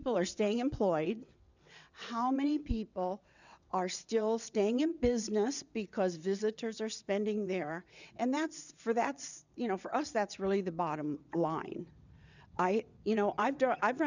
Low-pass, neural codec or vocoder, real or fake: 7.2 kHz; vocoder, 22.05 kHz, 80 mel bands, WaveNeXt; fake